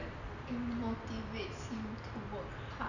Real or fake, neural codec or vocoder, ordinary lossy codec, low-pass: real; none; none; 7.2 kHz